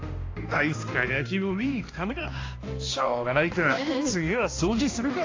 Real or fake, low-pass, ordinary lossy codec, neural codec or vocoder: fake; 7.2 kHz; AAC, 32 kbps; codec, 16 kHz, 1 kbps, X-Codec, HuBERT features, trained on balanced general audio